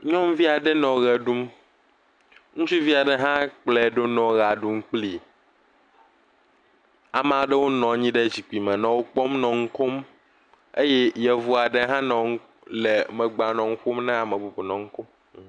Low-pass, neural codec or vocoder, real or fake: 9.9 kHz; none; real